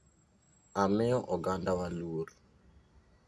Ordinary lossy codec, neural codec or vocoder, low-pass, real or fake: none; none; none; real